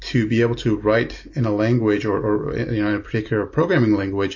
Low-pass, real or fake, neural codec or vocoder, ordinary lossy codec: 7.2 kHz; real; none; MP3, 32 kbps